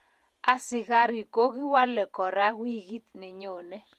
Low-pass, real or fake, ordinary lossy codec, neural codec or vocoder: 14.4 kHz; fake; Opus, 32 kbps; vocoder, 48 kHz, 128 mel bands, Vocos